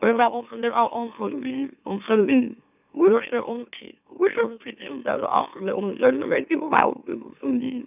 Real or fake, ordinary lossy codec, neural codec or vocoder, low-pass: fake; none; autoencoder, 44.1 kHz, a latent of 192 numbers a frame, MeloTTS; 3.6 kHz